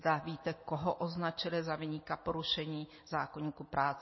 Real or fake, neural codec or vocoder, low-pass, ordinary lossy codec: real; none; 7.2 kHz; MP3, 24 kbps